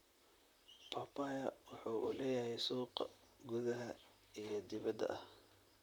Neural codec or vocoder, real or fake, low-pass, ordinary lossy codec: vocoder, 44.1 kHz, 128 mel bands, Pupu-Vocoder; fake; none; none